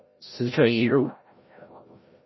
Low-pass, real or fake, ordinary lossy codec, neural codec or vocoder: 7.2 kHz; fake; MP3, 24 kbps; codec, 16 kHz, 0.5 kbps, FreqCodec, larger model